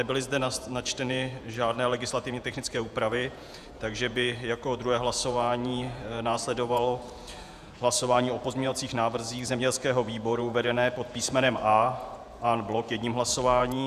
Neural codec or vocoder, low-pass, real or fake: vocoder, 48 kHz, 128 mel bands, Vocos; 14.4 kHz; fake